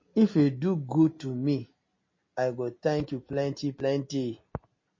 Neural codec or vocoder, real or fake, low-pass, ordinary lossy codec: none; real; 7.2 kHz; MP3, 32 kbps